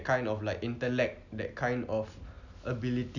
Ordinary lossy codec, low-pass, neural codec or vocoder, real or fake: none; 7.2 kHz; none; real